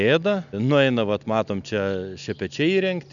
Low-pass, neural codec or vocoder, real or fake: 7.2 kHz; none; real